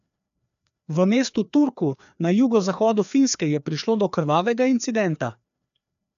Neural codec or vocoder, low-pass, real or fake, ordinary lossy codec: codec, 16 kHz, 2 kbps, FreqCodec, larger model; 7.2 kHz; fake; AAC, 96 kbps